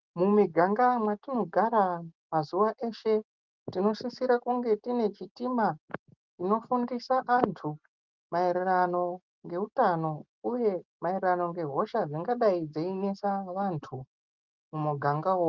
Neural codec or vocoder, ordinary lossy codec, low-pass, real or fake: none; Opus, 24 kbps; 7.2 kHz; real